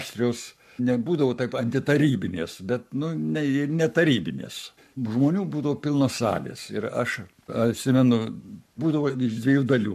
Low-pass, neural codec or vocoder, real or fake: 14.4 kHz; codec, 44.1 kHz, 7.8 kbps, Pupu-Codec; fake